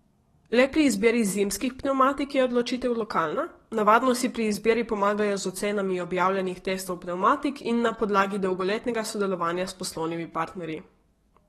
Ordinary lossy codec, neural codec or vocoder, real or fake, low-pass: AAC, 32 kbps; codec, 44.1 kHz, 7.8 kbps, DAC; fake; 19.8 kHz